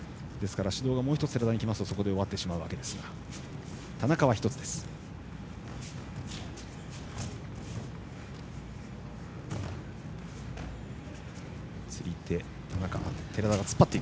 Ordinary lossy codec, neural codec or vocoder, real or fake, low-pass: none; none; real; none